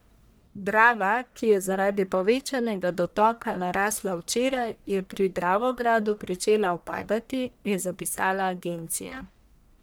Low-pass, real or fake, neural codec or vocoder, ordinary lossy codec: none; fake; codec, 44.1 kHz, 1.7 kbps, Pupu-Codec; none